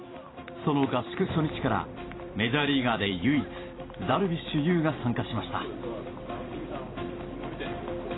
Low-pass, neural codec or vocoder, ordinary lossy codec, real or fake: 7.2 kHz; vocoder, 44.1 kHz, 128 mel bands every 256 samples, BigVGAN v2; AAC, 16 kbps; fake